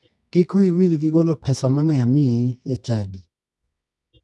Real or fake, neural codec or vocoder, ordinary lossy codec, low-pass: fake; codec, 24 kHz, 0.9 kbps, WavTokenizer, medium music audio release; none; none